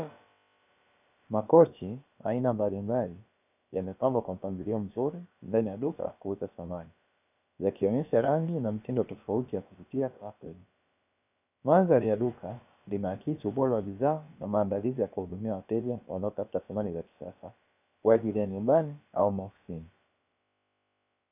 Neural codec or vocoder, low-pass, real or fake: codec, 16 kHz, about 1 kbps, DyCAST, with the encoder's durations; 3.6 kHz; fake